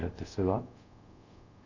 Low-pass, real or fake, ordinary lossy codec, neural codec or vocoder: 7.2 kHz; fake; none; codec, 24 kHz, 0.5 kbps, DualCodec